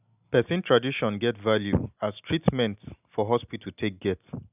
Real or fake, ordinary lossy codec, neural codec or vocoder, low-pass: real; none; none; 3.6 kHz